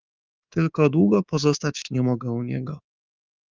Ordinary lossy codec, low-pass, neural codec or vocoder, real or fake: Opus, 24 kbps; 7.2 kHz; codec, 24 kHz, 3.1 kbps, DualCodec; fake